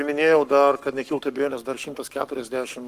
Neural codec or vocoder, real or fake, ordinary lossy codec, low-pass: codec, 44.1 kHz, 7.8 kbps, Pupu-Codec; fake; Opus, 32 kbps; 14.4 kHz